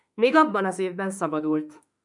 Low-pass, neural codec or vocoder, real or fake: 10.8 kHz; autoencoder, 48 kHz, 32 numbers a frame, DAC-VAE, trained on Japanese speech; fake